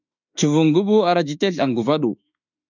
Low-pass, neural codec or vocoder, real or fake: 7.2 kHz; autoencoder, 48 kHz, 32 numbers a frame, DAC-VAE, trained on Japanese speech; fake